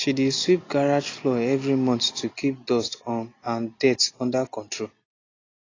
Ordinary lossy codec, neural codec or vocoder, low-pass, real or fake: AAC, 32 kbps; none; 7.2 kHz; real